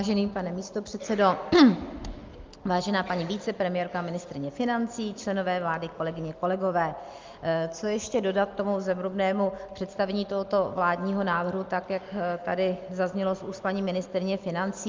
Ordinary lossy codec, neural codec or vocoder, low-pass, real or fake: Opus, 24 kbps; none; 7.2 kHz; real